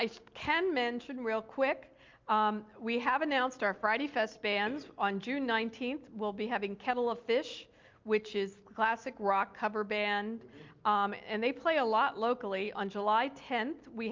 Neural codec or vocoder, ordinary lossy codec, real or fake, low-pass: none; Opus, 24 kbps; real; 7.2 kHz